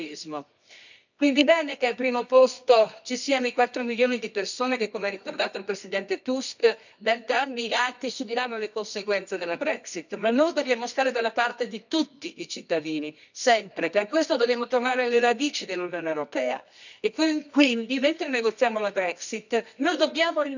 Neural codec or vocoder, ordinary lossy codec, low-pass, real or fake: codec, 24 kHz, 0.9 kbps, WavTokenizer, medium music audio release; none; 7.2 kHz; fake